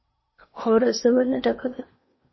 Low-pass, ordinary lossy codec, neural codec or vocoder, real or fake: 7.2 kHz; MP3, 24 kbps; codec, 16 kHz in and 24 kHz out, 0.8 kbps, FocalCodec, streaming, 65536 codes; fake